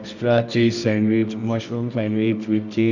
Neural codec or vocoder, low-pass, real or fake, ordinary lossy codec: codec, 24 kHz, 0.9 kbps, WavTokenizer, medium music audio release; 7.2 kHz; fake; none